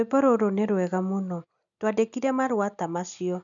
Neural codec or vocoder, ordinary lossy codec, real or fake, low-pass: none; none; real; 7.2 kHz